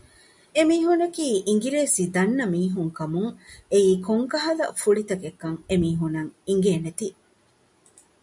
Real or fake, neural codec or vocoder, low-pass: real; none; 10.8 kHz